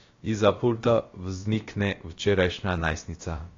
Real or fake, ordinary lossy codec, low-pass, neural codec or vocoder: fake; AAC, 32 kbps; 7.2 kHz; codec, 16 kHz, about 1 kbps, DyCAST, with the encoder's durations